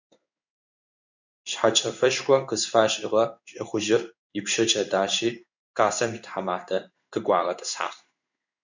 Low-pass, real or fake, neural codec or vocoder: 7.2 kHz; fake; codec, 16 kHz in and 24 kHz out, 1 kbps, XY-Tokenizer